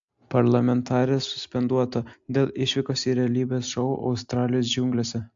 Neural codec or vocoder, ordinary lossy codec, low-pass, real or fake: none; AAC, 64 kbps; 7.2 kHz; real